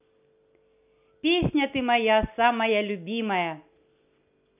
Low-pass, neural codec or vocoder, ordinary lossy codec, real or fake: 3.6 kHz; none; none; real